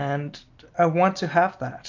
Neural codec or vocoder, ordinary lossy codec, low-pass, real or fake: none; MP3, 64 kbps; 7.2 kHz; real